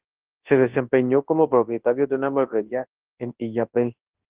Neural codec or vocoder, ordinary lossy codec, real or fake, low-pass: codec, 24 kHz, 0.9 kbps, WavTokenizer, large speech release; Opus, 16 kbps; fake; 3.6 kHz